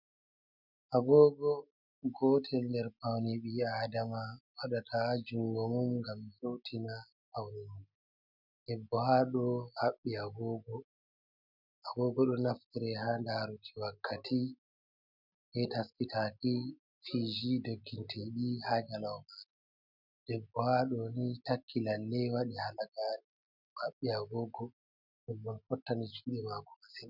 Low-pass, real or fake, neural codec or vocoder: 5.4 kHz; real; none